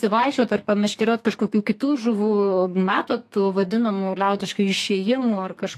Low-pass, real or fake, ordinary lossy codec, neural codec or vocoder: 14.4 kHz; fake; AAC, 64 kbps; codec, 32 kHz, 1.9 kbps, SNAC